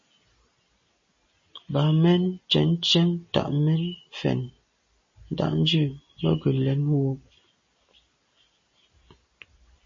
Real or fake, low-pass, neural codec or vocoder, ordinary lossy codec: real; 7.2 kHz; none; MP3, 32 kbps